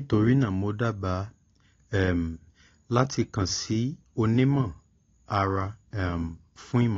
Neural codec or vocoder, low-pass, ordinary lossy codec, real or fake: none; 7.2 kHz; AAC, 32 kbps; real